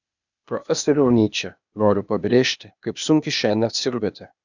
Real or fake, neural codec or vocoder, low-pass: fake; codec, 16 kHz, 0.8 kbps, ZipCodec; 7.2 kHz